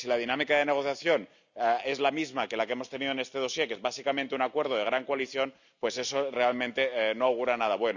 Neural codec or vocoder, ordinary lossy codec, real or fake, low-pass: none; none; real; 7.2 kHz